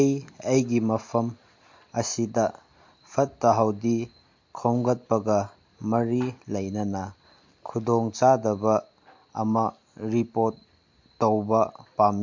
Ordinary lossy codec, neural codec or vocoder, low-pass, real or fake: MP3, 48 kbps; none; 7.2 kHz; real